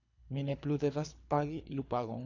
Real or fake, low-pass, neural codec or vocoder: fake; 7.2 kHz; codec, 24 kHz, 3 kbps, HILCodec